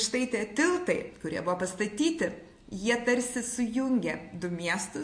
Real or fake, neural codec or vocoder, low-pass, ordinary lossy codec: real; none; 9.9 kHz; MP3, 48 kbps